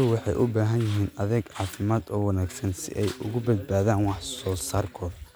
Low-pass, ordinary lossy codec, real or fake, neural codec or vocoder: none; none; real; none